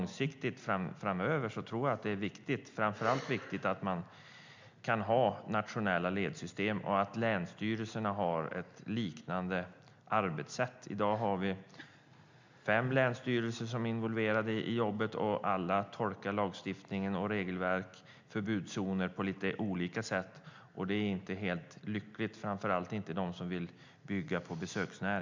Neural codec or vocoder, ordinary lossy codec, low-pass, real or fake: none; MP3, 64 kbps; 7.2 kHz; real